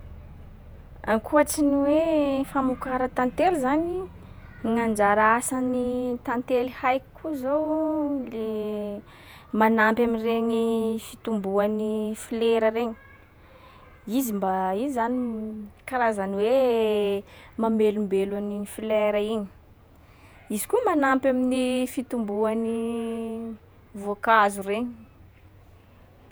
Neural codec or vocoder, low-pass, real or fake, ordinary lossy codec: vocoder, 48 kHz, 128 mel bands, Vocos; none; fake; none